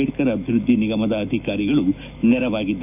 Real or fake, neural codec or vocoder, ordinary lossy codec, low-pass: fake; autoencoder, 48 kHz, 128 numbers a frame, DAC-VAE, trained on Japanese speech; none; 3.6 kHz